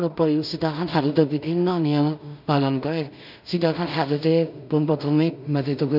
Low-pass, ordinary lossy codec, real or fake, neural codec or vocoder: 5.4 kHz; none; fake; codec, 16 kHz in and 24 kHz out, 0.4 kbps, LongCat-Audio-Codec, two codebook decoder